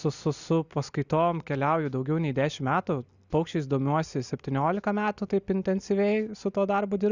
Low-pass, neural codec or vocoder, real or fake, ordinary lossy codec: 7.2 kHz; none; real; Opus, 64 kbps